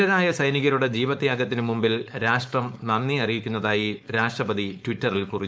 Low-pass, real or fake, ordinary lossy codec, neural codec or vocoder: none; fake; none; codec, 16 kHz, 4.8 kbps, FACodec